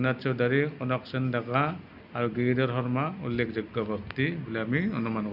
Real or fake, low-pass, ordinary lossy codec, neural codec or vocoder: real; 5.4 kHz; none; none